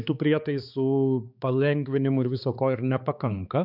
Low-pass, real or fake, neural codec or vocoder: 5.4 kHz; fake; codec, 16 kHz, 4 kbps, X-Codec, HuBERT features, trained on balanced general audio